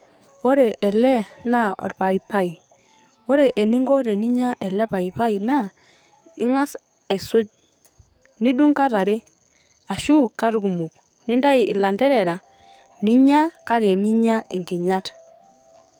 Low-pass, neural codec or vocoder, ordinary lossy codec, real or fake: none; codec, 44.1 kHz, 2.6 kbps, SNAC; none; fake